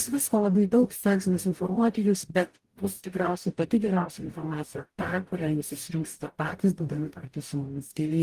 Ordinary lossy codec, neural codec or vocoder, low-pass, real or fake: Opus, 24 kbps; codec, 44.1 kHz, 0.9 kbps, DAC; 14.4 kHz; fake